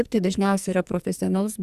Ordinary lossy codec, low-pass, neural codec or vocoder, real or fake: Opus, 64 kbps; 14.4 kHz; codec, 44.1 kHz, 2.6 kbps, SNAC; fake